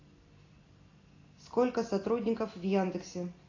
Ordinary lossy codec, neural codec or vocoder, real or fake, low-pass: AAC, 32 kbps; none; real; 7.2 kHz